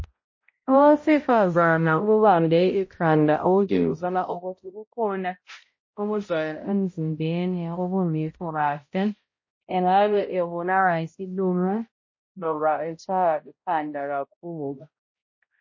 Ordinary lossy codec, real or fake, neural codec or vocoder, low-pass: MP3, 32 kbps; fake; codec, 16 kHz, 0.5 kbps, X-Codec, HuBERT features, trained on balanced general audio; 7.2 kHz